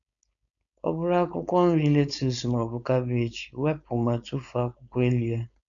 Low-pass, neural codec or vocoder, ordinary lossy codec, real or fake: 7.2 kHz; codec, 16 kHz, 4.8 kbps, FACodec; none; fake